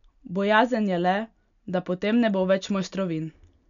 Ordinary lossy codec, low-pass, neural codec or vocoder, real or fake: none; 7.2 kHz; none; real